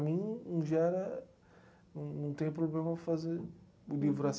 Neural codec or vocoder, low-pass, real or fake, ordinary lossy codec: none; none; real; none